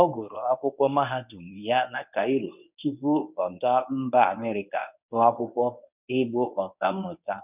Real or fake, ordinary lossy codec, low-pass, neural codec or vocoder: fake; none; 3.6 kHz; codec, 24 kHz, 0.9 kbps, WavTokenizer, medium speech release version 1